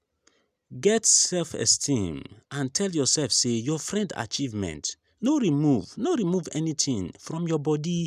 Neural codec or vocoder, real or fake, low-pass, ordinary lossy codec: none; real; 14.4 kHz; none